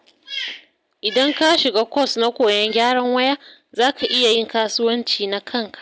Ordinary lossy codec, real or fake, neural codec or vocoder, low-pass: none; real; none; none